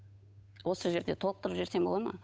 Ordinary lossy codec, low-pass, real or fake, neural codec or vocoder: none; none; fake; codec, 16 kHz, 8 kbps, FunCodec, trained on Chinese and English, 25 frames a second